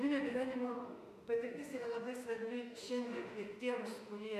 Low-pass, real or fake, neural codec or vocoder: 14.4 kHz; fake; autoencoder, 48 kHz, 32 numbers a frame, DAC-VAE, trained on Japanese speech